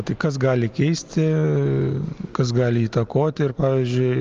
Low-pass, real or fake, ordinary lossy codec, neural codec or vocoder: 7.2 kHz; real; Opus, 32 kbps; none